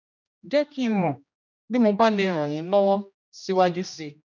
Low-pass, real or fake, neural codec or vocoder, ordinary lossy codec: 7.2 kHz; fake; codec, 16 kHz, 1 kbps, X-Codec, HuBERT features, trained on general audio; none